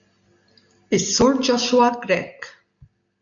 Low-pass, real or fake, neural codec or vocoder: 7.2 kHz; real; none